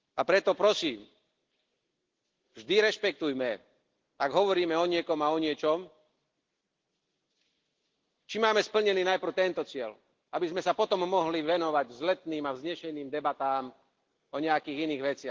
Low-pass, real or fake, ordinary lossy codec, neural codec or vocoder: 7.2 kHz; real; Opus, 16 kbps; none